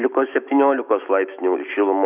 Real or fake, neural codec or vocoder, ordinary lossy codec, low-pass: fake; autoencoder, 48 kHz, 128 numbers a frame, DAC-VAE, trained on Japanese speech; Opus, 64 kbps; 3.6 kHz